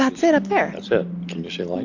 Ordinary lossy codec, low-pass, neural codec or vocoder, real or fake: AAC, 48 kbps; 7.2 kHz; none; real